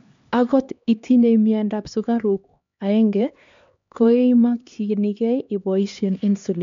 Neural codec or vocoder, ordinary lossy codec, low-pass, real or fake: codec, 16 kHz, 2 kbps, X-Codec, HuBERT features, trained on LibriSpeech; MP3, 64 kbps; 7.2 kHz; fake